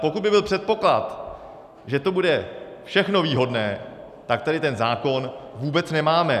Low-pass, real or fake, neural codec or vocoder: 14.4 kHz; real; none